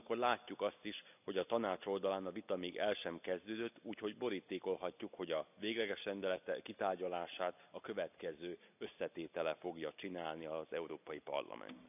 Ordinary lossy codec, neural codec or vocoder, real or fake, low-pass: none; none; real; 3.6 kHz